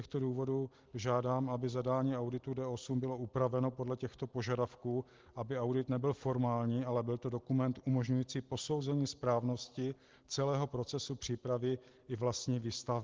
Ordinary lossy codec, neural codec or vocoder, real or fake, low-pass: Opus, 16 kbps; none; real; 7.2 kHz